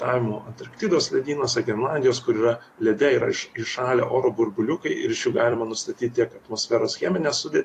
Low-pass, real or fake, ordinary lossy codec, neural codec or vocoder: 14.4 kHz; fake; AAC, 48 kbps; vocoder, 44.1 kHz, 128 mel bands every 256 samples, BigVGAN v2